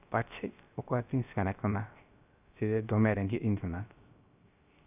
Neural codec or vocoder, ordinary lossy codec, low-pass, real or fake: codec, 16 kHz, 0.3 kbps, FocalCodec; none; 3.6 kHz; fake